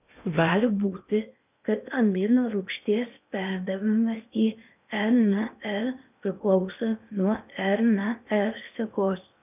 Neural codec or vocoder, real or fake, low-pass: codec, 16 kHz in and 24 kHz out, 0.8 kbps, FocalCodec, streaming, 65536 codes; fake; 3.6 kHz